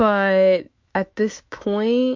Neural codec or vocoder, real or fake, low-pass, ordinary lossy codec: autoencoder, 48 kHz, 128 numbers a frame, DAC-VAE, trained on Japanese speech; fake; 7.2 kHz; MP3, 48 kbps